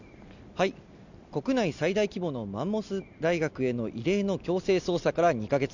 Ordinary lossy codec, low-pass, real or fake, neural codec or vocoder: none; 7.2 kHz; real; none